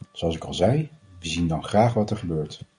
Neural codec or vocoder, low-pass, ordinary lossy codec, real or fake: none; 9.9 kHz; MP3, 96 kbps; real